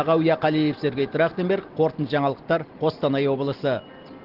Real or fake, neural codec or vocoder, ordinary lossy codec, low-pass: real; none; Opus, 16 kbps; 5.4 kHz